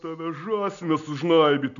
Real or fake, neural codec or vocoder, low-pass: real; none; 7.2 kHz